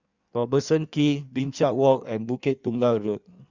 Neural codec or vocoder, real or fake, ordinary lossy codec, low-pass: codec, 16 kHz in and 24 kHz out, 1.1 kbps, FireRedTTS-2 codec; fake; Opus, 64 kbps; 7.2 kHz